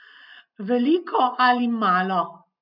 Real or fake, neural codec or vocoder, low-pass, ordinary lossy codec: real; none; 5.4 kHz; none